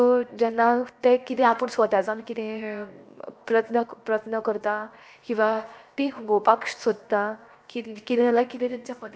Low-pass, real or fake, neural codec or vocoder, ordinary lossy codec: none; fake; codec, 16 kHz, 0.7 kbps, FocalCodec; none